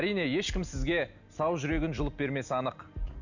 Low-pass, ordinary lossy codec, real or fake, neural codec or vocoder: 7.2 kHz; none; real; none